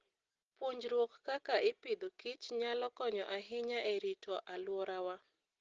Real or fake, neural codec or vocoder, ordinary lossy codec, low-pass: real; none; Opus, 16 kbps; 7.2 kHz